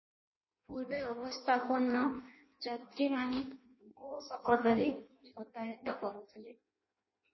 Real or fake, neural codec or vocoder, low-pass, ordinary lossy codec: fake; codec, 16 kHz in and 24 kHz out, 0.6 kbps, FireRedTTS-2 codec; 7.2 kHz; MP3, 24 kbps